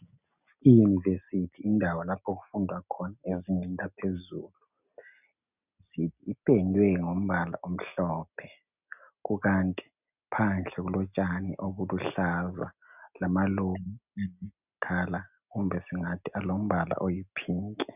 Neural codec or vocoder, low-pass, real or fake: none; 3.6 kHz; real